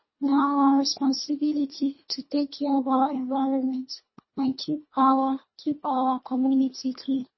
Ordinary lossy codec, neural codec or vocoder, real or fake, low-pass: MP3, 24 kbps; codec, 24 kHz, 1.5 kbps, HILCodec; fake; 7.2 kHz